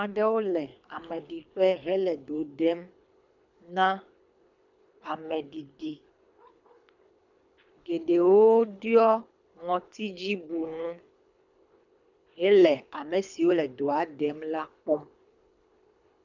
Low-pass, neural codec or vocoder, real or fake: 7.2 kHz; codec, 24 kHz, 3 kbps, HILCodec; fake